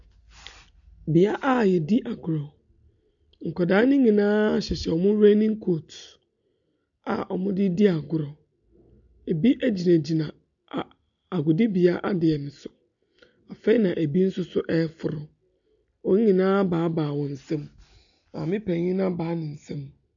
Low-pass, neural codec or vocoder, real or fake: 7.2 kHz; none; real